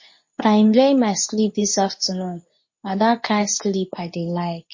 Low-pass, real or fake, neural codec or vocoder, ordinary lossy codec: 7.2 kHz; fake; codec, 24 kHz, 0.9 kbps, WavTokenizer, medium speech release version 2; MP3, 32 kbps